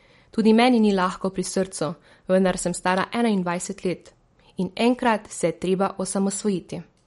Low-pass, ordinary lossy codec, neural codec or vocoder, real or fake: 19.8 kHz; MP3, 48 kbps; none; real